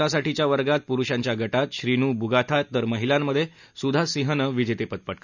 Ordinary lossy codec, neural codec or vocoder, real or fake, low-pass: none; none; real; 7.2 kHz